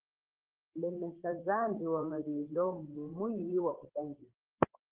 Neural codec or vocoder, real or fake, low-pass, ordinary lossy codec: codec, 16 kHz, 8 kbps, FreqCodec, larger model; fake; 3.6 kHz; Opus, 16 kbps